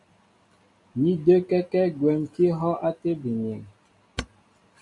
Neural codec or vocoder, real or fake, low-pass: none; real; 10.8 kHz